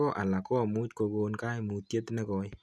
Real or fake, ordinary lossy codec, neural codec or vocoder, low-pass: real; none; none; none